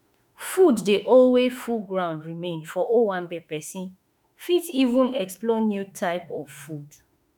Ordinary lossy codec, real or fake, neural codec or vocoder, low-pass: none; fake; autoencoder, 48 kHz, 32 numbers a frame, DAC-VAE, trained on Japanese speech; none